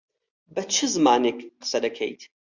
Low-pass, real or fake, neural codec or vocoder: 7.2 kHz; real; none